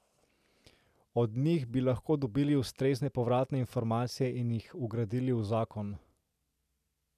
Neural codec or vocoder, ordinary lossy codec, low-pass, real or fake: none; none; 14.4 kHz; real